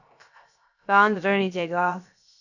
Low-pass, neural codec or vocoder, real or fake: 7.2 kHz; codec, 16 kHz, 0.3 kbps, FocalCodec; fake